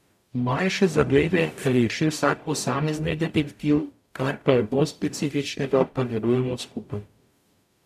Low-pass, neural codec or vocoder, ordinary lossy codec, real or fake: 14.4 kHz; codec, 44.1 kHz, 0.9 kbps, DAC; none; fake